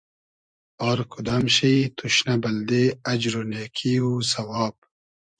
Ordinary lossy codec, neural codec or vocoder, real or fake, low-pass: MP3, 64 kbps; vocoder, 24 kHz, 100 mel bands, Vocos; fake; 9.9 kHz